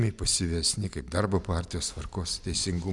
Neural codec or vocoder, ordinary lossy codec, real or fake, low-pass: none; MP3, 96 kbps; real; 10.8 kHz